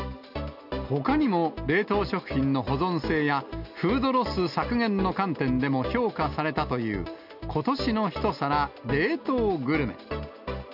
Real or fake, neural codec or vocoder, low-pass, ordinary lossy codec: real; none; 5.4 kHz; none